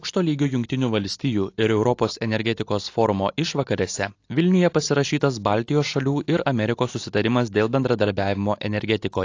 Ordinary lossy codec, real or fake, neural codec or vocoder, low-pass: AAC, 48 kbps; real; none; 7.2 kHz